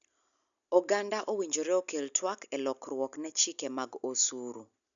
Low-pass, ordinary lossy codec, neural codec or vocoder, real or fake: 7.2 kHz; none; none; real